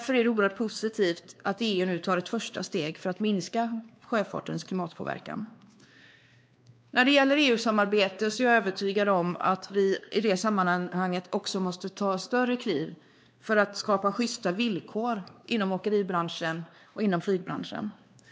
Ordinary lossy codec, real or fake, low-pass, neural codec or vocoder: none; fake; none; codec, 16 kHz, 2 kbps, X-Codec, WavLM features, trained on Multilingual LibriSpeech